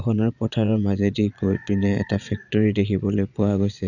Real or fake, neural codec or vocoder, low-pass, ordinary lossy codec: fake; vocoder, 22.05 kHz, 80 mel bands, Vocos; 7.2 kHz; none